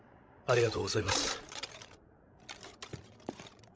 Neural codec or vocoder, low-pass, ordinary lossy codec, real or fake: codec, 16 kHz, 16 kbps, FreqCodec, larger model; none; none; fake